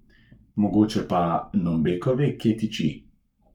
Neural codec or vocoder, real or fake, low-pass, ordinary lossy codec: codec, 44.1 kHz, 7.8 kbps, Pupu-Codec; fake; 19.8 kHz; none